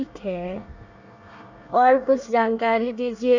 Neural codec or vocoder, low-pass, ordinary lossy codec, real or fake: codec, 24 kHz, 1 kbps, SNAC; 7.2 kHz; none; fake